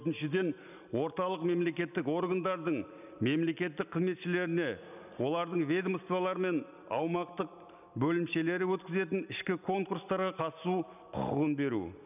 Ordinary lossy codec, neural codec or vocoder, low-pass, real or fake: none; none; 3.6 kHz; real